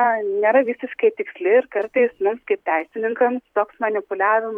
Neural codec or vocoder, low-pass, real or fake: vocoder, 44.1 kHz, 128 mel bands every 256 samples, BigVGAN v2; 19.8 kHz; fake